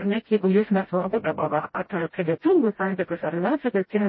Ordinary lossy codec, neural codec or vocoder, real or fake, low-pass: MP3, 24 kbps; codec, 16 kHz, 0.5 kbps, FreqCodec, smaller model; fake; 7.2 kHz